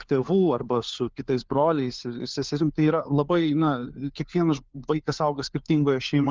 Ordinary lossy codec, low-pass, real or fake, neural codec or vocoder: Opus, 32 kbps; 7.2 kHz; fake; codec, 16 kHz, 4 kbps, FunCodec, trained on LibriTTS, 50 frames a second